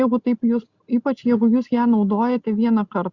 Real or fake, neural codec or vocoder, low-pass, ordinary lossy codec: real; none; 7.2 kHz; Opus, 64 kbps